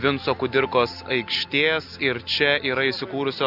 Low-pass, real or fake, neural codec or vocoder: 5.4 kHz; real; none